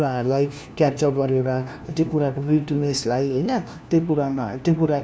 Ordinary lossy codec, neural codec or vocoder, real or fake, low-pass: none; codec, 16 kHz, 1 kbps, FunCodec, trained on LibriTTS, 50 frames a second; fake; none